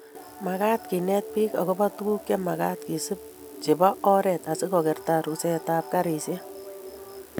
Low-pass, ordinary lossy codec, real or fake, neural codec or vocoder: none; none; real; none